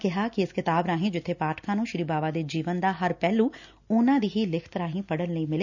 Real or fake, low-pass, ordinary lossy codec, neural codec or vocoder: real; 7.2 kHz; none; none